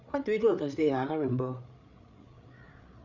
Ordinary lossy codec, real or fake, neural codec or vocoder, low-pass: none; fake; codec, 16 kHz, 8 kbps, FreqCodec, larger model; 7.2 kHz